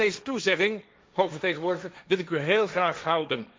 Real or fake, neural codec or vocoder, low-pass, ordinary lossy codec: fake; codec, 16 kHz, 1.1 kbps, Voila-Tokenizer; none; none